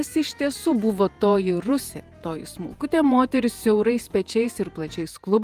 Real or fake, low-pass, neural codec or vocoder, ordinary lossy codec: fake; 14.4 kHz; vocoder, 48 kHz, 128 mel bands, Vocos; Opus, 32 kbps